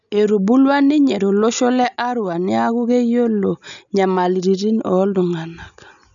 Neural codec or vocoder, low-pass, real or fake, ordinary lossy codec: none; 7.2 kHz; real; none